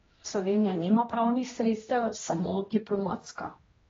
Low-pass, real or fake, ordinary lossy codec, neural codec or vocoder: 7.2 kHz; fake; AAC, 24 kbps; codec, 16 kHz, 1 kbps, X-Codec, HuBERT features, trained on general audio